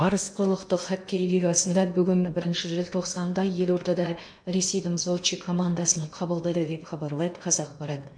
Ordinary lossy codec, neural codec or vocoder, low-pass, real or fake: MP3, 64 kbps; codec, 16 kHz in and 24 kHz out, 0.8 kbps, FocalCodec, streaming, 65536 codes; 9.9 kHz; fake